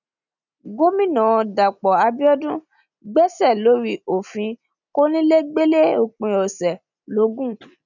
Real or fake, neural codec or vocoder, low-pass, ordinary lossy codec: real; none; 7.2 kHz; none